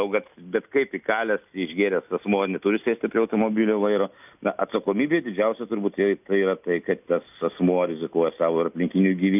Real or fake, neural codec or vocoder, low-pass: real; none; 3.6 kHz